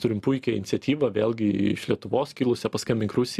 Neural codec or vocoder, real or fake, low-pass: none; real; 14.4 kHz